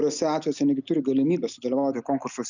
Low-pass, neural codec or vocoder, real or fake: 7.2 kHz; none; real